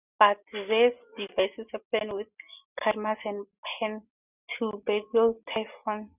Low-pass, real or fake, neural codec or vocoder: 3.6 kHz; real; none